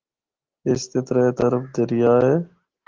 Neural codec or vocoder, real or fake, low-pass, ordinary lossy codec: none; real; 7.2 kHz; Opus, 16 kbps